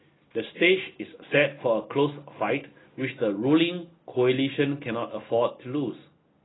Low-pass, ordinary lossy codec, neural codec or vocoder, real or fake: 7.2 kHz; AAC, 16 kbps; none; real